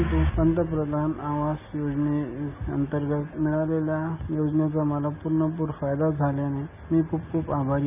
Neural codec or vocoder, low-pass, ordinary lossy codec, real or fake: none; 3.6 kHz; none; real